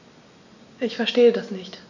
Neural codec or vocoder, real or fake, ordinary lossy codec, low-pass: none; real; none; 7.2 kHz